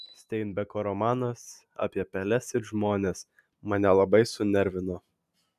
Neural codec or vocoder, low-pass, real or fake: none; 14.4 kHz; real